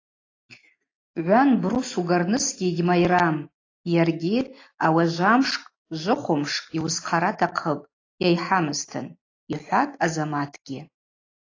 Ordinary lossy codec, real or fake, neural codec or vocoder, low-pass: AAC, 32 kbps; real; none; 7.2 kHz